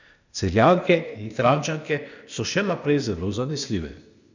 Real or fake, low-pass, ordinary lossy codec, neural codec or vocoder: fake; 7.2 kHz; none; codec, 16 kHz, 0.8 kbps, ZipCodec